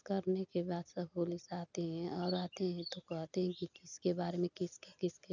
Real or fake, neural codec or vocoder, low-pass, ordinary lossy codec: real; none; 7.2 kHz; none